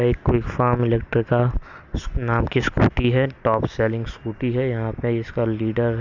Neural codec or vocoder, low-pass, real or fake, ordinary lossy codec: none; 7.2 kHz; real; none